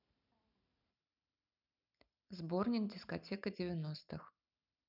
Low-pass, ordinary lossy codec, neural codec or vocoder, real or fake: 5.4 kHz; none; none; real